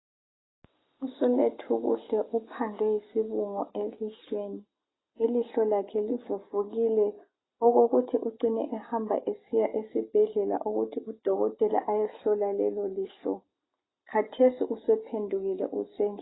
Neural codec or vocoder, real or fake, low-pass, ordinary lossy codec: none; real; 7.2 kHz; AAC, 16 kbps